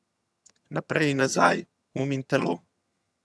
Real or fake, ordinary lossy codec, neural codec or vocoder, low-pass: fake; none; vocoder, 22.05 kHz, 80 mel bands, HiFi-GAN; none